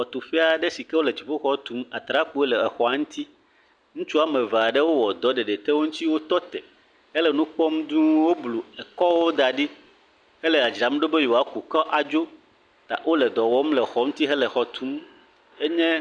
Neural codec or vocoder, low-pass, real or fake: none; 9.9 kHz; real